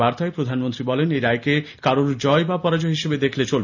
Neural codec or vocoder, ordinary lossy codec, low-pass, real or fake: none; none; 7.2 kHz; real